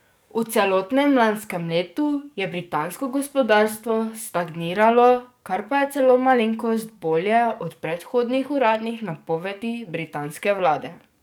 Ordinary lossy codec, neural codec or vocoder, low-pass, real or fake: none; codec, 44.1 kHz, 7.8 kbps, DAC; none; fake